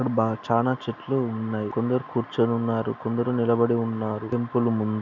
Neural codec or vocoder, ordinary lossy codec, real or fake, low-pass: none; none; real; 7.2 kHz